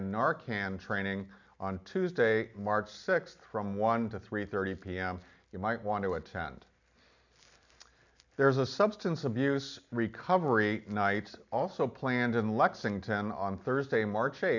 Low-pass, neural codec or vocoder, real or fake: 7.2 kHz; none; real